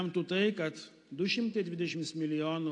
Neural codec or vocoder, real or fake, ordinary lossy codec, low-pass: none; real; AAC, 48 kbps; 10.8 kHz